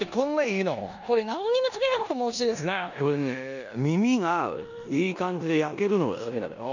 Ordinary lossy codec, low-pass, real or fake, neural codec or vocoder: none; 7.2 kHz; fake; codec, 16 kHz in and 24 kHz out, 0.9 kbps, LongCat-Audio-Codec, four codebook decoder